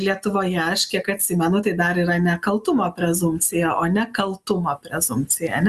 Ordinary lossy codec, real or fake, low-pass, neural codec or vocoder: Opus, 64 kbps; real; 14.4 kHz; none